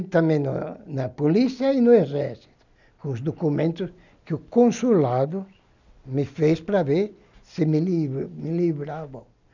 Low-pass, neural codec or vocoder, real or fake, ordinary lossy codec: 7.2 kHz; none; real; none